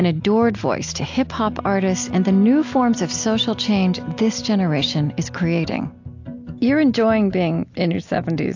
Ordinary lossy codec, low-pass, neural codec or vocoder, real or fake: AAC, 48 kbps; 7.2 kHz; none; real